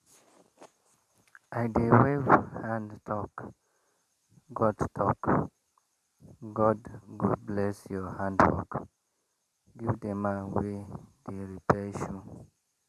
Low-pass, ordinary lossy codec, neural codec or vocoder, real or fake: 14.4 kHz; none; vocoder, 44.1 kHz, 128 mel bands every 512 samples, BigVGAN v2; fake